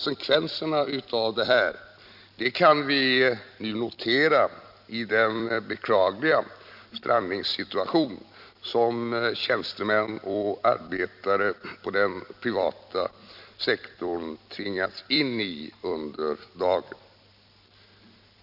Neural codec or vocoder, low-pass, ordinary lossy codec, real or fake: vocoder, 44.1 kHz, 128 mel bands every 256 samples, BigVGAN v2; 5.4 kHz; none; fake